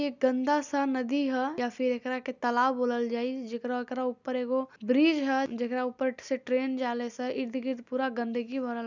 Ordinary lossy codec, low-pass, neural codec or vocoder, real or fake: none; 7.2 kHz; none; real